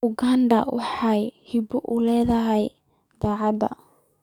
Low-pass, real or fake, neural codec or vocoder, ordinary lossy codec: 19.8 kHz; fake; codec, 44.1 kHz, 7.8 kbps, DAC; none